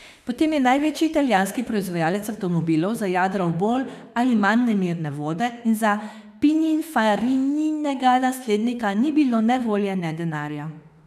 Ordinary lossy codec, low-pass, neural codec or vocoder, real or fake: none; 14.4 kHz; autoencoder, 48 kHz, 32 numbers a frame, DAC-VAE, trained on Japanese speech; fake